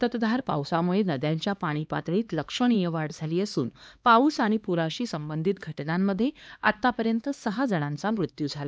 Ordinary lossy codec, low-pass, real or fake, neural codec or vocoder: none; none; fake; codec, 16 kHz, 2 kbps, X-Codec, HuBERT features, trained on LibriSpeech